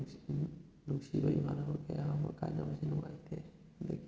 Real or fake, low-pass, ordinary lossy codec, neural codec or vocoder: real; none; none; none